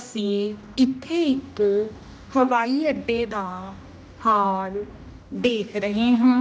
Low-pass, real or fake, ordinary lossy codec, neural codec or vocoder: none; fake; none; codec, 16 kHz, 1 kbps, X-Codec, HuBERT features, trained on general audio